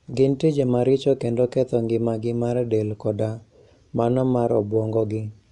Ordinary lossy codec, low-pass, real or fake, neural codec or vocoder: none; 10.8 kHz; real; none